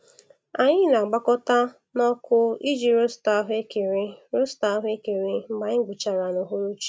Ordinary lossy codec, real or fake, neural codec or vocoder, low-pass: none; real; none; none